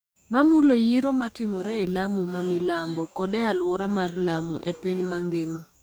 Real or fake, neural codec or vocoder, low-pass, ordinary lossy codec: fake; codec, 44.1 kHz, 2.6 kbps, DAC; none; none